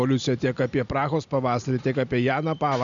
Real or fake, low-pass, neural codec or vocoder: real; 7.2 kHz; none